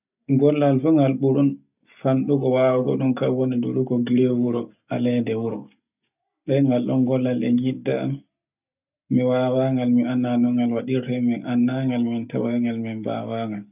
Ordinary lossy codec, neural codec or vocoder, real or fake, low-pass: none; none; real; 3.6 kHz